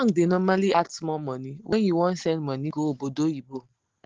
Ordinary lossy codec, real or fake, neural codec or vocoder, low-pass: Opus, 16 kbps; real; none; 7.2 kHz